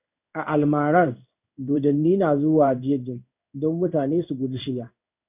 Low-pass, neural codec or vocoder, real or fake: 3.6 kHz; codec, 16 kHz in and 24 kHz out, 1 kbps, XY-Tokenizer; fake